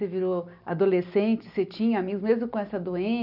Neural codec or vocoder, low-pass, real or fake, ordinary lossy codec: none; 5.4 kHz; real; none